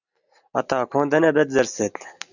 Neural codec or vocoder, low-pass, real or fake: none; 7.2 kHz; real